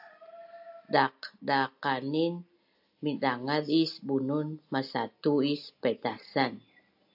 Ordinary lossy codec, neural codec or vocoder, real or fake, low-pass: AAC, 48 kbps; none; real; 5.4 kHz